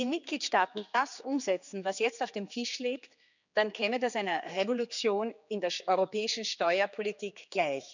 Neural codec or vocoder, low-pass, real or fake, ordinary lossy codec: codec, 16 kHz, 4 kbps, X-Codec, HuBERT features, trained on general audio; 7.2 kHz; fake; none